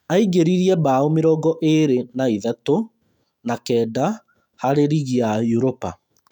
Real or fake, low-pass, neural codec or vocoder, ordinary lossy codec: fake; 19.8 kHz; autoencoder, 48 kHz, 128 numbers a frame, DAC-VAE, trained on Japanese speech; none